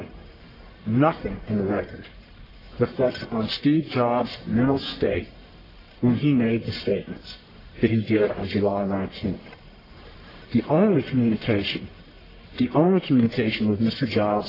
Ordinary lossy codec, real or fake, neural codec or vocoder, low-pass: AAC, 24 kbps; fake; codec, 44.1 kHz, 1.7 kbps, Pupu-Codec; 5.4 kHz